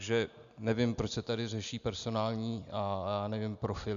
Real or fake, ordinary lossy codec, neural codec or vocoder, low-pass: real; MP3, 96 kbps; none; 7.2 kHz